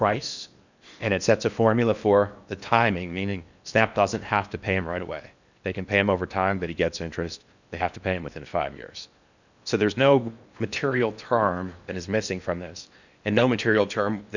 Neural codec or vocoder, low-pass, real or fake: codec, 16 kHz in and 24 kHz out, 0.8 kbps, FocalCodec, streaming, 65536 codes; 7.2 kHz; fake